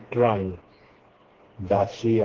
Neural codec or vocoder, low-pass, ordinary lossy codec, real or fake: codec, 16 kHz, 2 kbps, FreqCodec, smaller model; 7.2 kHz; Opus, 16 kbps; fake